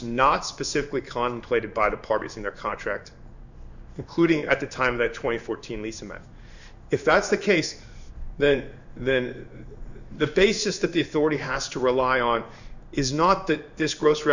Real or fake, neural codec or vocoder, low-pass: fake; codec, 16 kHz in and 24 kHz out, 1 kbps, XY-Tokenizer; 7.2 kHz